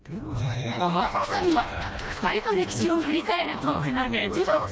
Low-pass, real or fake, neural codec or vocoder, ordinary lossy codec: none; fake; codec, 16 kHz, 1 kbps, FreqCodec, smaller model; none